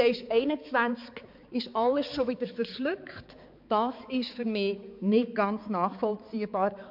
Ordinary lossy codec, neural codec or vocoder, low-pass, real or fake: MP3, 48 kbps; codec, 16 kHz, 4 kbps, X-Codec, HuBERT features, trained on balanced general audio; 5.4 kHz; fake